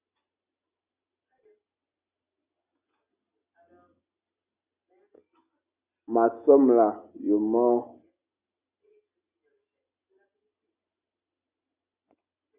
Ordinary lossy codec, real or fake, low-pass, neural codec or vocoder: Opus, 64 kbps; real; 3.6 kHz; none